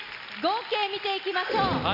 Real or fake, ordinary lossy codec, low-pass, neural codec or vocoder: real; none; 5.4 kHz; none